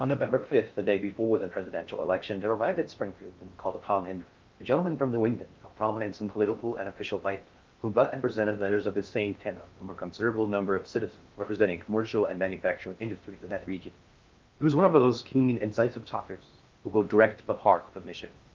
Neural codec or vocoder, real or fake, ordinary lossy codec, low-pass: codec, 16 kHz in and 24 kHz out, 0.6 kbps, FocalCodec, streaming, 4096 codes; fake; Opus, 32 kbps; 7.2 kHz